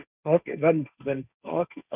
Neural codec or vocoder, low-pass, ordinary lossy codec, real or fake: codec, 16 kHz, 1.1 kbps, Voila-Tokenizer; 3.6 kHz; none; fake